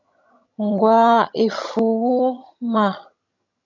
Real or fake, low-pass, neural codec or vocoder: fake; 7.2 kHz; vocoder, 22.05 kHz, 80 mel bands, HiFi-GAN